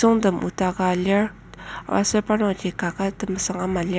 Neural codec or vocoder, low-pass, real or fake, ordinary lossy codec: none; none; real; none